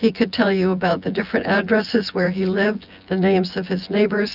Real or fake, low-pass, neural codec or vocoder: fake; 5.4 kHz; vocoder, 24 kHz, 100 mel bands, Vocos